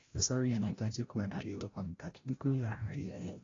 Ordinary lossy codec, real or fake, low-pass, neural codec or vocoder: AAC, 32 kbps; fake; 7.2 kHz; codec, 16 kHz, 0.5 kbps, FreqCodec, larger model